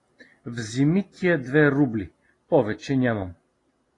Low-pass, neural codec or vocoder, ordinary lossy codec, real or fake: 10.8 kHz; none; AAC, 32 kbps; real